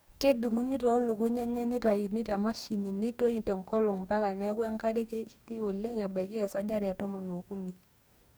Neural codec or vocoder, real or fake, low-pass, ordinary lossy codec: codec, 44.1 kHz, 2.6 kbps, DAC; fake; none; none